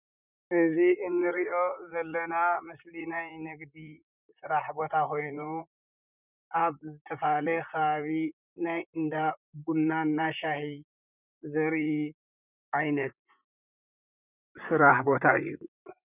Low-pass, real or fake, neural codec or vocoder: 3.6 kHz; fake; vocoder, 44.1 kHz, 128 mel bands, Pupu-Vocoder